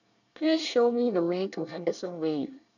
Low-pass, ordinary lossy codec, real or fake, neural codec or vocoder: 7.2 kHz; none; fake; codec, 24 kHz, 1 kbps, SNAC